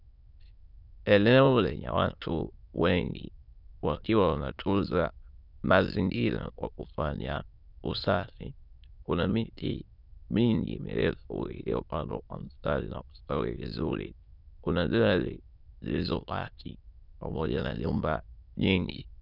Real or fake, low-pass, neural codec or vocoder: fake; 5.4 kHz; autoencoder, 22.05 kHz, a latent of 192 numbers a frame, VITS, trained on many speakers